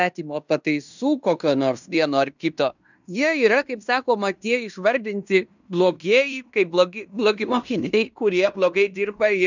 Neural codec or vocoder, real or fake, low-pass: codec, 16 kHz in and 24 kHz out, 0.9 kbps, LongCat-Audio-Codec, fine tuned four codebook decoder; fake; 7.2 kHz